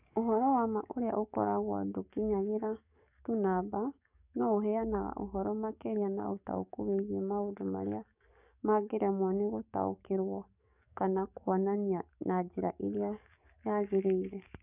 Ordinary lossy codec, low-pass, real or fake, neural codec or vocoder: none; 3.6 kHz; fake; codec, 44.1 kHz, 7.8 kbps, DAC